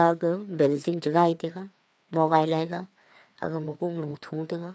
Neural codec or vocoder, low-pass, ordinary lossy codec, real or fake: codec, 16 kHz, 2 kbps, FreqCodec, larger model; none; none; fake